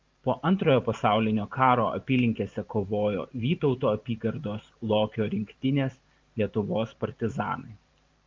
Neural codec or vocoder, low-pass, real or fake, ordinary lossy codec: vocoder, 44.1 kHz, 128 mel bands every 512 samples, BigVGAN v2; 7.2 kHz; fake; Opus, 24 kbps